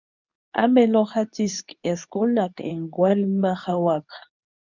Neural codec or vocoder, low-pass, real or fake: codec, 24 kHz, 0.9 kbps, WavTokenizer, medium speech release version 2; 7.2 kHz; fake